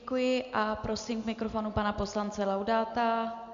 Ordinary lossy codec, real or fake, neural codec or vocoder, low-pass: MP3, 64 kbps; real; none; 7.2 kHz